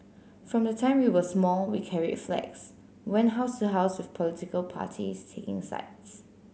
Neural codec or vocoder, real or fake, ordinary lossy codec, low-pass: none; real; none; none